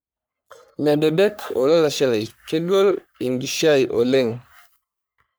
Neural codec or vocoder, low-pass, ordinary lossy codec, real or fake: codec, 44.1 kHz, 3.4 kbps, Pupu-Codec; none; none; fake